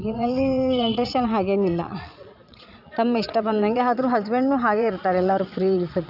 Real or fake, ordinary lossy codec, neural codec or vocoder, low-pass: fake; AAC, 48 kbps; vocoder, 22.05 kHz, 80 mel bands, Vocos; 5.4 kHz